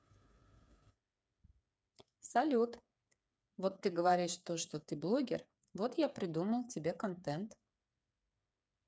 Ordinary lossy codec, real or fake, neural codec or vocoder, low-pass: none; fake; codec, 16 kHz, 8 kbps, FreqCodec, smaller model; none